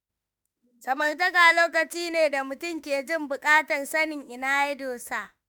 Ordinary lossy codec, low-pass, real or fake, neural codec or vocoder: none; none; fake; autoencoder, 48 kHz, 32 numbers a frame, DAC-VAE, trained on Japanese speech